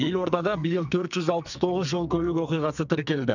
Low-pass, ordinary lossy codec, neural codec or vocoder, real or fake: 7.2 kHz; none; codec, 44.1 kHz, 2.6 kbps, SNAC; fake